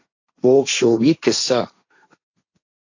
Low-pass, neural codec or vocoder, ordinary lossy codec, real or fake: 7.2 kHz; codec, 16 kHz, 1.1 kbps, Voila-Tokenizer; AAC, 48 kbps; fake